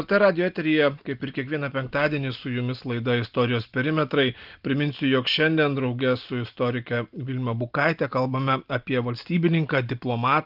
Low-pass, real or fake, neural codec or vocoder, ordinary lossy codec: 5.4 kHz; real; none; Opus, 32 kbps